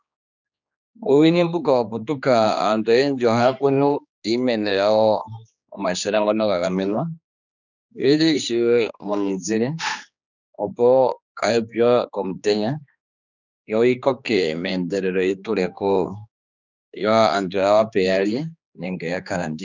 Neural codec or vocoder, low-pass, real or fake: codec, 16 kHz, 2 kbps, X-Codec, HuBERT features, trained on general audio; 7.2 kHz; fake